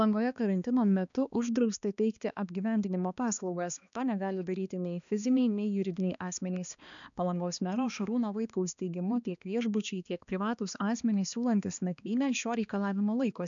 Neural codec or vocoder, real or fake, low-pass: codec, 16 kHz, 2 kbps, X-Codec, HuBERT features, trained on balanced general audio; fake; 7.2 kHz